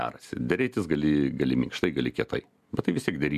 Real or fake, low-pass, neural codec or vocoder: real; 14.4 kHz; none